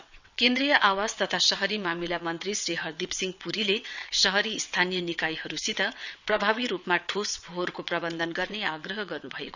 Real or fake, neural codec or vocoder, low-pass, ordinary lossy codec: fake; vocoder, 22.05 kHz, 80 mel bands, WaveNeXt; 7.2 kHz; none